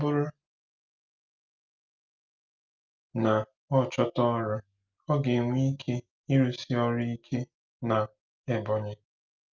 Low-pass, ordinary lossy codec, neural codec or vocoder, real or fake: 7.2 kHz; Opus, 24 kbps; none; real